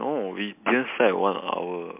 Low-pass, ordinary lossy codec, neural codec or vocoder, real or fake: 3.6 kHz; MP3, 32 kbps; none; real